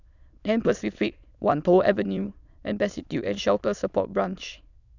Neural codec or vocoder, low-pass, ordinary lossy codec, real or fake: autoencoder, 22.05 kHz, a latent of 192 numbers a frame, VITS, trained on many speakers; 7.2 kHz; none; fake